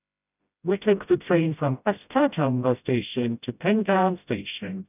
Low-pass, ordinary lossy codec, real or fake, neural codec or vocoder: 3.6 kHz; none; fake; codec, 16 kHz, 0.5 kbps, FreqCodec, smaller model